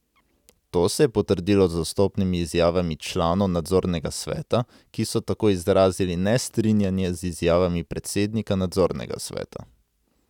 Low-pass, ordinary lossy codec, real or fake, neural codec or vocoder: 19.8 kHz; none; real; none